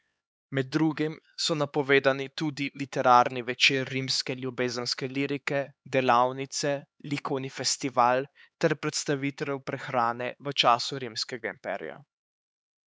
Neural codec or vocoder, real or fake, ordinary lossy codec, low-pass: codec, 16 kHz, 4 kbps, X-Codec, HuBERT features, trained on LibriSpeech; fake; none; none